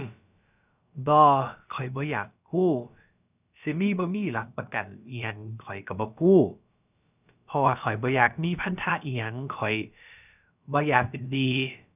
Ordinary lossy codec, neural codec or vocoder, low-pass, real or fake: none; codec, 16 kHz, about 1 kbps, DyCAST, with the encoder's durations; 3.6 kHz; fake